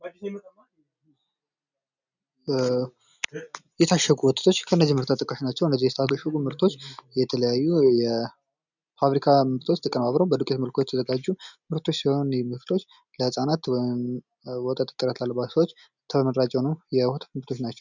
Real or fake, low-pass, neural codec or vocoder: real; 7.2 kHz; none